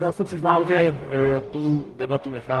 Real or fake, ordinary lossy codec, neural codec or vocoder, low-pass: fake; Opus, 32 kbps; codec, 44.1 kHz, 0.9 kbps, DAC; 14.4 kHz